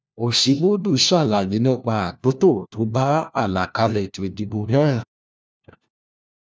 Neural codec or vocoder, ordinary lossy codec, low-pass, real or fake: codec, 16 kHz, 1 kbps, FunCodec, trained on LibriTTS, 50 frames a second; none; none; fake